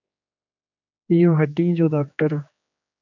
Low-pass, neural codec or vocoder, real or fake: 7.2 kHz; codec, 16 kHz, 2 kbps, X-Codec, HuBERT features, trained on general audio; fake